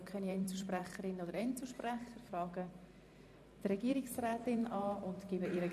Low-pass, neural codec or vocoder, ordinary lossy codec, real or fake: 14.4 kHz; vocoder, 44.1 kHz, 128 mel bands every 512 samples, BigVGAN v2; none; fake